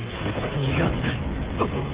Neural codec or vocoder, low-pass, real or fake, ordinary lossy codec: codec, 16 kHz, 16 kbps, FreqCodec, smaller model; 3.6 kHz; fake; Opus, 16 kbps